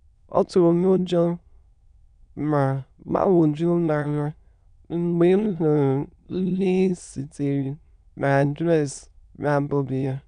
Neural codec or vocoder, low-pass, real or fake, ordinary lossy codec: autoencoder, 22.05 kHz, a latent of 192 numbers a frame, VITS, trained on many speakers; 9.9 kHz; fake; none